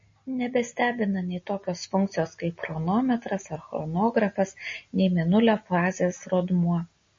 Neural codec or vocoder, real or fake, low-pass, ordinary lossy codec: none; real; 7.2 kHz; MP3, 32 kbps